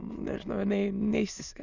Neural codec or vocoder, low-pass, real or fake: autoencoder, 22.05 kHz, a latent of 192 numbers a frame, VITS, trained on many speakers; 7.2 kHz; fake